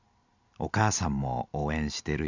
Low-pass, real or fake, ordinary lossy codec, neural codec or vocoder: 7.2 kHz; real; none; none